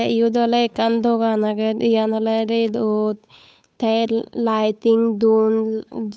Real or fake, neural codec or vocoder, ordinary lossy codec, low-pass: fake; codec, 16 kHz, 8 kbps, FunCodec, trained on Chinese and English, 25 frames a second; none; none